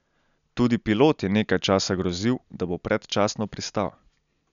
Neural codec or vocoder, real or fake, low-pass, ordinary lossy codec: none; real; 7.2 kHz; none